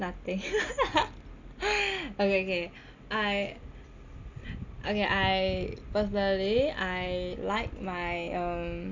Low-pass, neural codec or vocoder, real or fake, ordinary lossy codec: 7.2 kHz; none; real; none